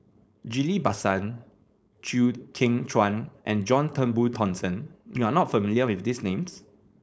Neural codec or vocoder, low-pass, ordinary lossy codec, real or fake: codec, 16 kHz, 4.8 kbps, FACodec; none; none; fake